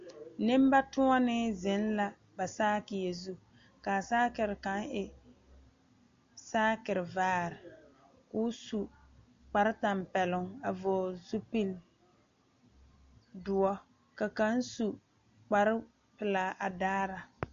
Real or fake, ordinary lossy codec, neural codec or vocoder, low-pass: real; MP3, 48 kbps; none; 7.2 kHz